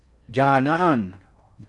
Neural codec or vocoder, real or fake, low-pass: codec, 16 kHz in and 24 kHz out, 0.6 kbps, FocalCodec, streaming, 4096 codes; fake; 10.8 kHz